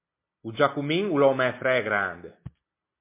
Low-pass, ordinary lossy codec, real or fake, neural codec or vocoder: 3.6 kHz; MP3, 24 kbps; real; none